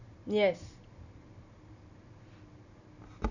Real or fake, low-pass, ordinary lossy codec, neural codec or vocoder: real; 7.2 kHz; none; none